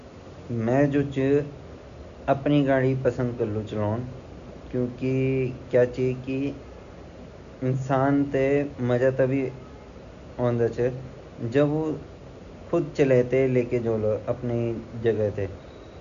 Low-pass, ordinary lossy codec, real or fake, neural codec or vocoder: 7.2 kHz; none; real; none